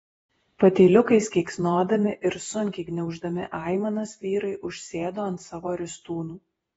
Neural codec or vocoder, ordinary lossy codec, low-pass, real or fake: vocoder, 48 kHz, 128 mel bands, Vocos; AAC, 24 kbps; 19.8 kHz; fake